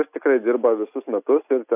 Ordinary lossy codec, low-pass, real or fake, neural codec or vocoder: AAC, 32 kbps; 3.6 kHz; real; none